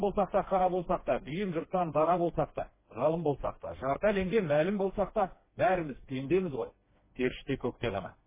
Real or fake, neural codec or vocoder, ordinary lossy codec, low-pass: fake; codec, 16 kHz, 2 kbps, FreqCodec, smaller model; MP3, 16 kbps; 3.6 kHz